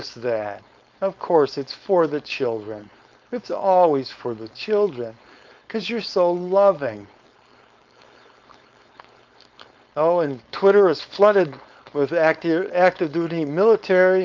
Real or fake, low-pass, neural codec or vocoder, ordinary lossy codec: fake; 7.2 kHz; codec, 16 kHz, 4.8 kbps, FACodec; Opus, 24 kbps